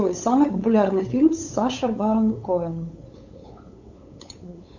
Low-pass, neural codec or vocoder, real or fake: 7.2 kHz; codec, 16 kHz, 8 kbps, FunCodec, trained on LibriTTS, 25 frames a second; fake